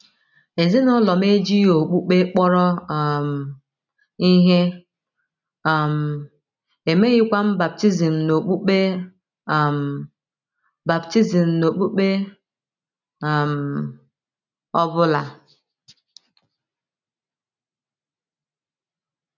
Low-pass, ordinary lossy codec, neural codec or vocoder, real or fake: 7.2 kHz; none; none; real